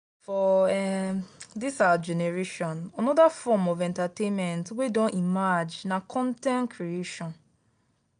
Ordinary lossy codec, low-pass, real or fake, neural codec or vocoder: none; 9.9 kHz; real; none